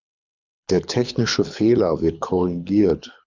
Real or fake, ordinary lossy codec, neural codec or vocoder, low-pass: fake; Opus, 64 kbps; codec, 24 kHz, 6 kbps, HILCodec; 7.2 kHz